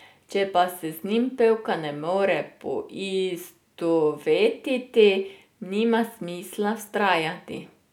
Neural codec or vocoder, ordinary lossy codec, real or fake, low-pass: none; none; real; 19.8 kHz